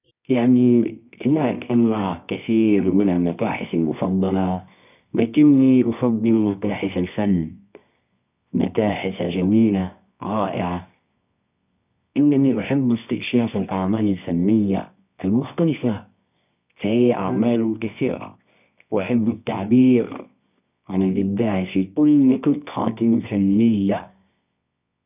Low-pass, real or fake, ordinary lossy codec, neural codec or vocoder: 3.6 kHz; fake; none; codec, 24 kHz, 0.9 kbps, WavTokenizer, medium music audio release